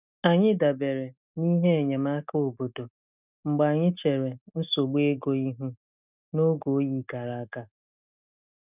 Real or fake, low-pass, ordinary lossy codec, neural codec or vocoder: real; 3.6 kHz; none; none